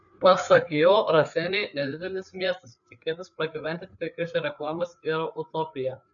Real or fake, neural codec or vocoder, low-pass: fake; codec, 16 kHz, 4 kbps, FreqCodec, larger model; 7.2 kHz